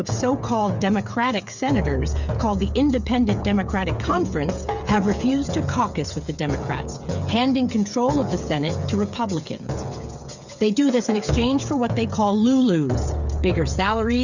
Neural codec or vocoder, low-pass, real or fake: codec, 16 kHz, 8 kbps, FreqCodec, smaller model; 7.2 kHz; fake